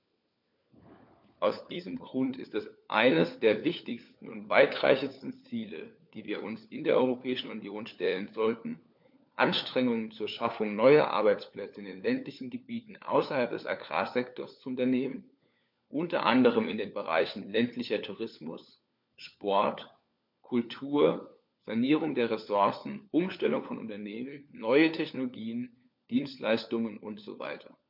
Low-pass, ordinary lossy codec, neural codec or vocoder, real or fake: 5.4 kHz; MP3, 48 kbps; codec, 16 kHz, 4 kbps, FunCodec, trained on LibriTTS, 50 frames a second; fake